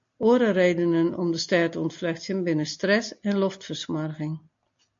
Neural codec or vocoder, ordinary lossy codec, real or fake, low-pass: none; MP3, 48 kbps; real; 7.2 kHz